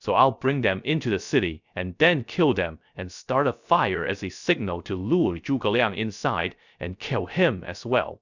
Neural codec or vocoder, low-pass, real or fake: codec, 16 kHz, 0.3 kbps, FocalCodec; 7.2 kHz; fake